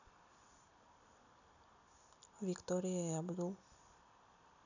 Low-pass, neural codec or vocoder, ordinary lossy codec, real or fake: 7.2 kHz; none; none; real